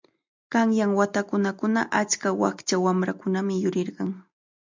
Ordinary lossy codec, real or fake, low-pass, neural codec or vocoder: MP3, 64 kbps; real; 7.2 kHz; none